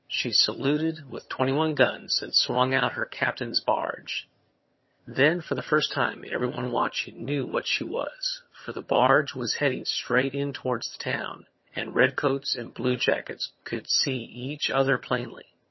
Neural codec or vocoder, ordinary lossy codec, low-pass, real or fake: vocoder, 22.05 kHz, 80 mel bands, HiFi-GAN; MP3, 24 kbps; 7.2 kHz; fake